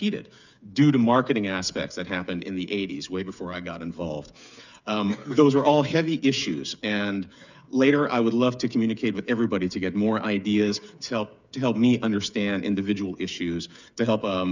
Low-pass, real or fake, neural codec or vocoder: 7.2 kHz; fake; codec, 16 kHz, 8 kbps, FreqCodec, smaller model